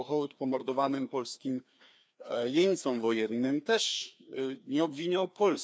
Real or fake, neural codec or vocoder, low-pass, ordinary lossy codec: fake; codec, 16 kHz, 2 kbps, FreqCodec, larger model; none; none